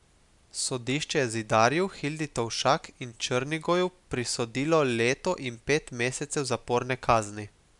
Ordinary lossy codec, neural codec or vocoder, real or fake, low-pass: none; none; real; 10.8 kHz